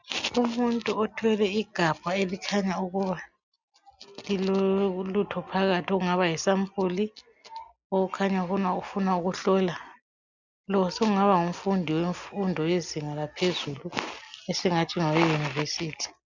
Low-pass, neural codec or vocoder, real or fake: 7.2 kHz; none; real